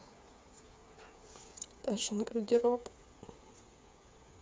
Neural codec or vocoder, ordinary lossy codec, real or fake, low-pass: codec, 16 kHz, 8 kbps, FreqCodec, smaller model; none; fake; none